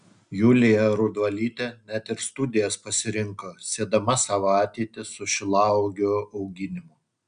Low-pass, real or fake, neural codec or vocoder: 9.9 kHz; real; none